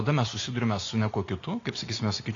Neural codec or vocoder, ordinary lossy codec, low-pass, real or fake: none; AAC, 48 kbps; 7.2 kHz; real